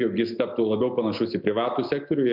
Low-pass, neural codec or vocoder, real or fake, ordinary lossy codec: 5.4 kHz; none; real; MP3, 48 kbps